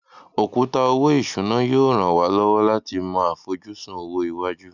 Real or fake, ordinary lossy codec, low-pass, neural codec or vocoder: real; none; 7.2 kHz; none